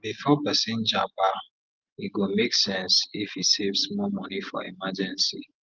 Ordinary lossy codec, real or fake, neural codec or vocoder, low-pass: Opus, 24 kbps; real; none; 7.2 kHz